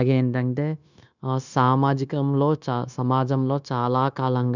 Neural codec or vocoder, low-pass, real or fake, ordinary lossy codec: codec, 16 kHz, 0.9 kbps, LongCat-Audio-Codec; 7.2 kHz; fake; none